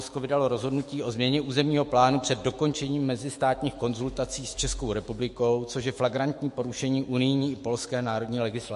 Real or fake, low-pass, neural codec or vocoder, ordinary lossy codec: fake; 14.4 kHz; autoencoder, 48 kHz, 128 numbers a frame, DAC-VAE, trained on Japanese speech; MP3, 48 kbps